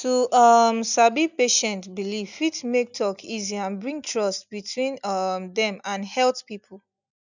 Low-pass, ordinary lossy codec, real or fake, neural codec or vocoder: 7.2 kHz; none; real; none